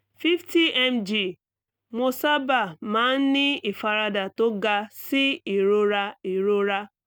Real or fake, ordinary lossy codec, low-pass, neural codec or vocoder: real; none; none; none